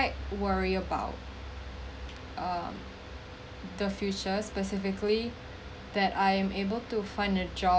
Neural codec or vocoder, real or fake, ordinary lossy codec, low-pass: none; real; none; none